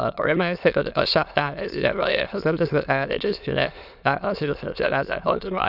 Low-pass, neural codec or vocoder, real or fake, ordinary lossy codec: 5.4 kHz; autoencoder, 22.05 kHz, a latent of 192 numbers a frame, VITS, trained on many speakers; fake; none